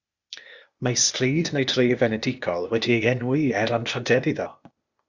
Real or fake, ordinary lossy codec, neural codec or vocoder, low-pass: fake; Opus, 64 kbps; codec, 16 kHz, 0.8 kbps, ZipCodec; 7.2 kHz